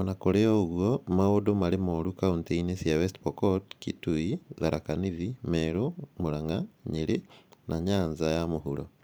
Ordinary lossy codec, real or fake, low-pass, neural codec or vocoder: none; fake; none; vocoder, 44.1 kHz, 128 mel bands every 512 samples, BigVGAN v2